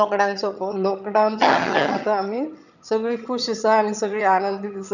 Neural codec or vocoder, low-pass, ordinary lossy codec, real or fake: vocoder, 22.05 kHz, 80 mel bands, HiFi-GAN; 7.2 kHz; none; fake